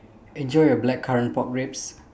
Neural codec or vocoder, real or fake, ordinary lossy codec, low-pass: none; real; none; none